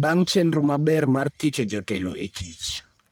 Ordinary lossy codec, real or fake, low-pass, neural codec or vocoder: none; fake; none; codec, 44.1 kHz, 1.7 kbps, Pupu-Codec